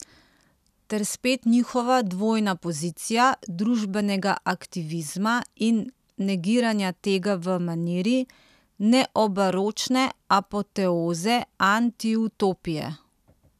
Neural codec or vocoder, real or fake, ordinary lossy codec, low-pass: none; real; none; 14.4 kHz